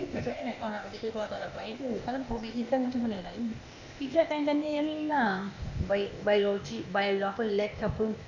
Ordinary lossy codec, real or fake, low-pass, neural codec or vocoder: none; fake; 7.2 kHz; codec, 16 kHz, 0.8 kbps, ZipCodec